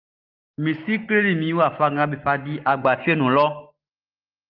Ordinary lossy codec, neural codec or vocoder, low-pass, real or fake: Opus, 24 kbps; none; 5.4 kHz; real